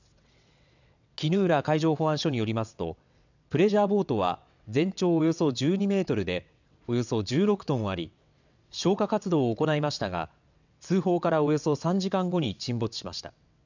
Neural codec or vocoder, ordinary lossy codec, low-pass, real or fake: vocoder, 22.05 kHz, 80 mel bands, WaveNeXt; none; 7.2 kHz; fake